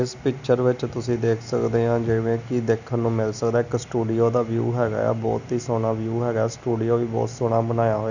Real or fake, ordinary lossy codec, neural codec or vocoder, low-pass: real; none; none; 7.2 kHz